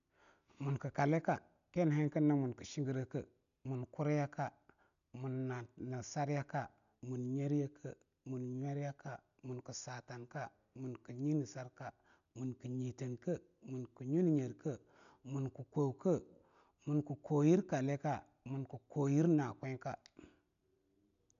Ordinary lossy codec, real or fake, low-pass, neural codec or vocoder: none; real; 7.2 kHz; none